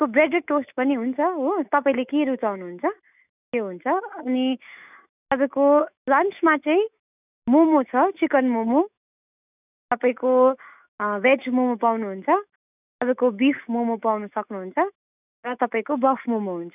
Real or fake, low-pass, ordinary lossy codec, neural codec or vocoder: fake; 3.6 kHz; none; autoencoder, 48 kHz, 128 numbers a frame, DAC-VAE, trained on Japanese speech